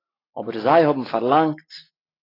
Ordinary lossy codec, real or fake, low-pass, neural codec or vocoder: AAC, 24 kbps; real; 5.4 kHz; none